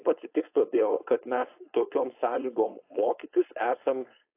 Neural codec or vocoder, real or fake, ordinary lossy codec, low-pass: codec, 16 kHz, 4.8 kbps, FACodec; fake; AAC, 24 kbps; 3.6 kHz